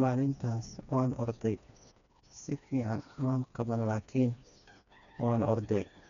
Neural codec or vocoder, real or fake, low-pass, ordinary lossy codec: codec, 16 kHz, 2 kbps, FreqCodec, smaller model; fake; 7.2 kHz; none